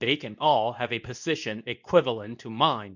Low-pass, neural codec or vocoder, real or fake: 7.2 kHz; codec, 24 kHz, 0.9 kbps, WavTokenizer, medium speech release version 2; fake